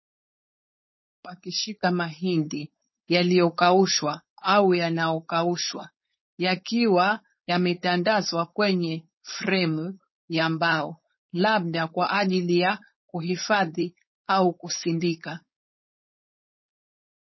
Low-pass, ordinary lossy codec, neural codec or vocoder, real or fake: 7.2 kHz; MP3, 24 kbps; codec, 16 kHz, 4.8 kbps, FACodec; fake